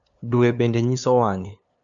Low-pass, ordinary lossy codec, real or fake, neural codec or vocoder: 7.2 kHz; none; fake; codec, 16 kHz, 2 kbps, FunCodec, trained on LibriTTS, 25 frames a second